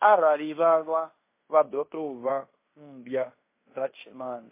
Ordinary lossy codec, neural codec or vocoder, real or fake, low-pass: MP3, 24 kbps; codec, 16 kHz in and 24 kHz out, 0.9 kbps, LongCat-Audio-Codec, fine tuned four codebook decoder; fake; 3.6 kHz